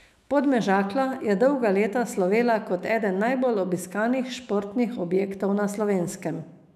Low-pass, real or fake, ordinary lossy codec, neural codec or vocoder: 14.4 kHz; fake; none; autoencoder, 48 kHz, 128 numbers a frame, DAC-VAE, trained on Japanese speech